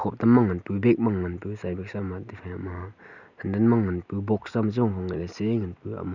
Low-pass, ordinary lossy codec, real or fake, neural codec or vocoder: 7.2 kHz; none; real; none